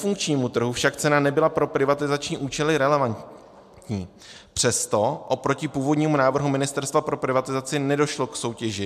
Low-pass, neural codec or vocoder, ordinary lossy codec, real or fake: 14.4 kHz; none; MP3, 96 kbps; real